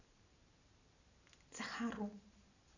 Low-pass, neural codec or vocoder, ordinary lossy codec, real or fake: 7.2 kHz; none; none; real